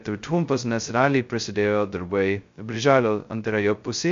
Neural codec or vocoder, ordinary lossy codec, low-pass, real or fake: codec, 16 kHz, 0.2 kbps, FocalCodec; MP3, 48 kbps; 7.2 kHz; fake